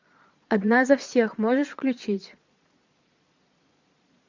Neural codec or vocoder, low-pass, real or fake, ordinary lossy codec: vocoder, 22.05 kHz, 80 mel bands, Vocos; 7.2 kHz; fake; MP3, 64 kbps